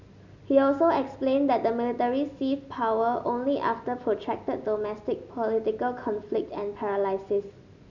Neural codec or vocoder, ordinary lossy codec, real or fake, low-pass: none; none; real; 7.2 kHz